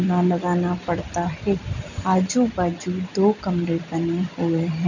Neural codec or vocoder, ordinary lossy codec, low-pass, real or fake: none; none; 7.2 kHz; real